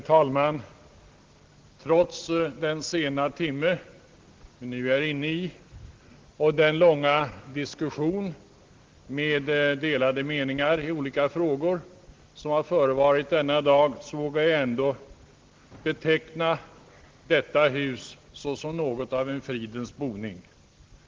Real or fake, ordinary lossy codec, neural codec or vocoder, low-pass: real; Opus, 16 kbps; none; 7.2 kHz